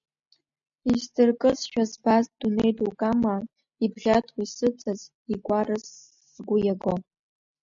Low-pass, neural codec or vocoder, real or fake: 7.2 kHz; none; real